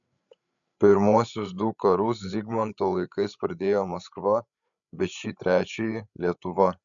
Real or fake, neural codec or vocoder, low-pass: fake; codec, 16 kHz, 8 kbps, FreqCodec, larger model; 7.2 kHz